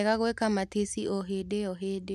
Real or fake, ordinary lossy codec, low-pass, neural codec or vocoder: real; none; 14.4 kHz; none